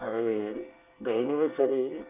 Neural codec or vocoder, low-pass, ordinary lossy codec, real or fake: codec, 24 kHz, 1 kbps, SNAC; 3.6 kHz; none; fake